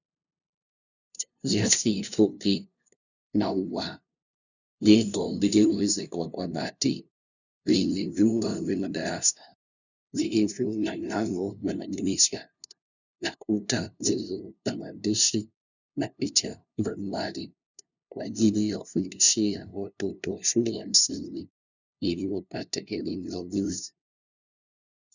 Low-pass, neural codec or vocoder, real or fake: 7.2 kHz; codec, 16 kHz, 0.5 kbps, FunCodec, trained on LibriTTS, 25 frames a second; fake